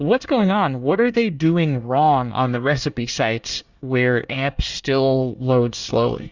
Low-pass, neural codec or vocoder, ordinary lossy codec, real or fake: 7.2 kHz; codec, 24 kHz, 1 kbps, SNAC; Opus, 64 kbps; fake